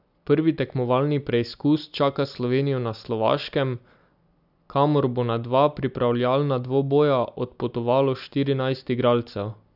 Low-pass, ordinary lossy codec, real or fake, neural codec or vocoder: 5.4 kHz; none; real; none